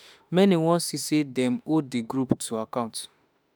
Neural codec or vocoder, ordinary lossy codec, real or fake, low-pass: autoencoder, 48 kHz, 32 numbers a frame, DAC-VAE, trained on Japanese speech; none; fake; none